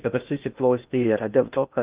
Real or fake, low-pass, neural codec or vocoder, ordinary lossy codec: fake; 3.6 kHz; codec, 16 kHz in and 24 kHz out, 0.6 kbps, FocalCodec, streaming, 2048 codes; Opus, 24 kbps